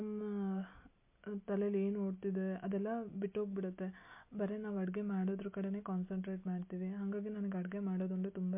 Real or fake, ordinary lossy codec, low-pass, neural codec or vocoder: real; MP3, 24 kbps; 3.6 kHz; none